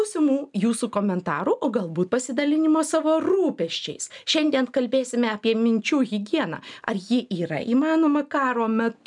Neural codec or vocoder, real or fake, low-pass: none; real; 10.8 kHz